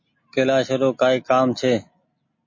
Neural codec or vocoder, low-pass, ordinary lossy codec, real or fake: none; 7.2 kHz; MP3, 32 kbps; real